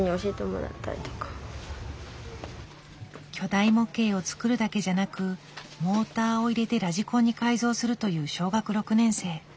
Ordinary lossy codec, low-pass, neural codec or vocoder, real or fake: none; none; none; real